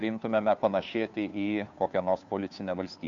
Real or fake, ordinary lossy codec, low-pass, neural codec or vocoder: fake; MP3, 64 kbps; 7.2 kHz; codec, 16 kHz, 2 kbps, FunCodec, trained on Chinese and English, 25 frames a second